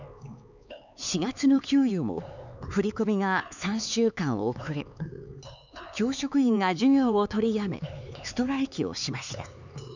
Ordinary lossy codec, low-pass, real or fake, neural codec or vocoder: none; 7.2 kHz; fake; codec, 16 kHz, 4 kbps, X-Codec, HuBERT features, trained on LibriSpeech